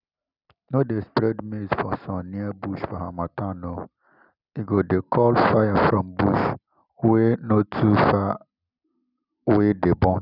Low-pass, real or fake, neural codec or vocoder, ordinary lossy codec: 5.4 kHz; real; none; none